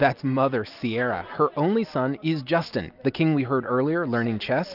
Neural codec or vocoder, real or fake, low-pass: none; real; 5.4 kHz